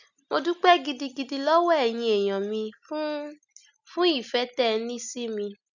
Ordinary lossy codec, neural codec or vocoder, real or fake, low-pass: none; none; real; 7.2 kHz